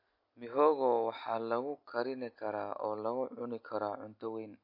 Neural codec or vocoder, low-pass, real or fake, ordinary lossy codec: none; 5.4 kHz; real; MP3, 32 kbps